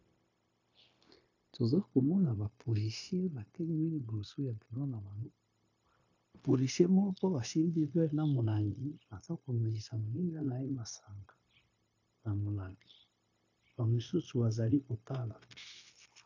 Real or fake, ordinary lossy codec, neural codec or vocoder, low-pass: fake; AAC, 48 kbps; codec, 16 kHz, 0.9 kbps, LongCat-Audio-Codec; 7.2 kHz